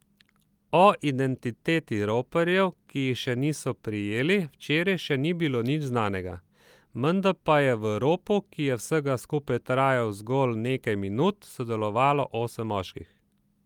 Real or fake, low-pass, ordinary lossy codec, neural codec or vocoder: real; 19.8 kHz; Opus, 32 kbps; none